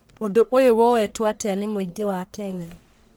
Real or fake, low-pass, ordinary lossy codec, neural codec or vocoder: fake; none; none; codec, 44.1 kHz, 1.7 kbps, Pupu-Codec